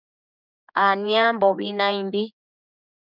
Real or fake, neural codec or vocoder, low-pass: fake; codec, 16 kHz, 4 kbps, X-Codec, HuBERT features, trained on general audio; 5.4 kHz